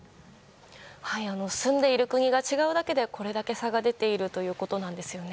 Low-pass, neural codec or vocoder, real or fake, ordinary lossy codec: none; none; real; none